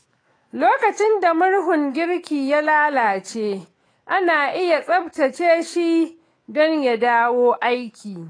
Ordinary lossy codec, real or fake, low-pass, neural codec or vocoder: AAC, 32 kbps; fake; 9.9 kHz; autoencoder, 48 kHz, 128 numbers a frame, DAC-VAE, trained on Japanese speech